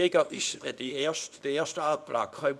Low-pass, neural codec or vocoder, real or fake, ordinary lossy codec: none; codec, 24 kHz, 0.9 kbps, WavTokenizer, small release; fake; none